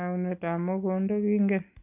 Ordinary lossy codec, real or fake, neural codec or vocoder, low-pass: none; real; none; 3.6 kHz